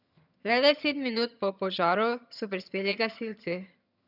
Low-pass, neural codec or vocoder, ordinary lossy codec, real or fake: 5.4 kHz; vocoder, 22.05 kHz, 80 mel bands, HiFi-GAN; none; fake